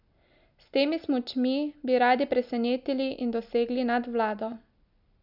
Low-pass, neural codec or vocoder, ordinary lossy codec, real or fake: 5.4 kHz; none; none; real